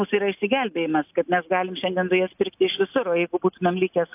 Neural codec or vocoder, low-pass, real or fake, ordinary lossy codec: none; 3.6 kHz; real; AAC, 32 kbps